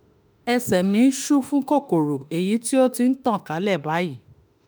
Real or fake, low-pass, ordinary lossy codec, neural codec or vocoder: fake; none; none; autoencoder, 48 kHz, 32 numbers a frame, DAC-VAE, trained on Japanese speech